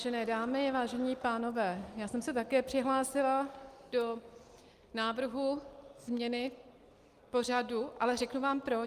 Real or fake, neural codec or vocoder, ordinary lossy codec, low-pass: real; none; Opus, 32 kbps; 14.4 kHz